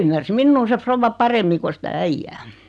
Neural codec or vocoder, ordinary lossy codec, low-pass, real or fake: none; none; none; real